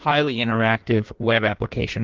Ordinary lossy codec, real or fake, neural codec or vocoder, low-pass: Opus, 16 kbps; fake; codec, 16 kHz in and 24 kHz out, 1.1 kbps, FireRedTTS-2 codec; 7.2 kHz